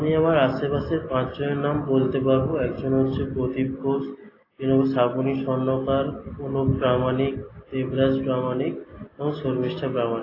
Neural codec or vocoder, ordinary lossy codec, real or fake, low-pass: none; AAC, 24 kbps; real; 5.4 kHz